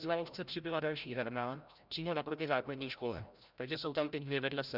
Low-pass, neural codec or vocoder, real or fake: 5.4 kHz; codec, 16 kHz, 0.5 kbps, FreqCodec, larger model; fake